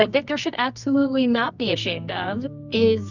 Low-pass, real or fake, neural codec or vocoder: 7.2 kHz; fake; codec, 24 kHz, 0.9 kbps, WavTokenizer, medium music audio release